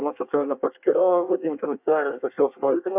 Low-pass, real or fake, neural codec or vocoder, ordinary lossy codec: 3.6 kHz; fake; codec, 24 kHz, 1 kbps, SNAC; AAC, 32 kbps